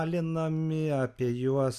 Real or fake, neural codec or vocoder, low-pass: real; none; 14.4 kHz